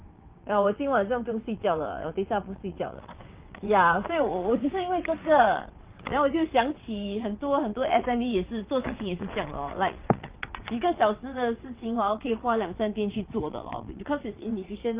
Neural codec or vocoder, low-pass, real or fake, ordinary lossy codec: vocoder, 22.05 kHz, 80 mel bands, WaveNeXt; 3.6 kHz; fake; Opus, 32 kbps